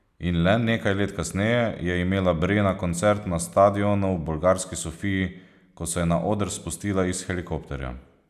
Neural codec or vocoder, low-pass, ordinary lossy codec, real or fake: none; 14.4 kHz; none; real